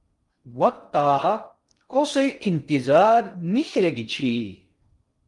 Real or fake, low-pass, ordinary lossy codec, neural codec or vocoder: fake; 10.8 kHz; Opus, 32 kbps; codec, 16 kHz in and 24 kHz out, 0.6 kbps, FocalCodec, streaming, 2048 codes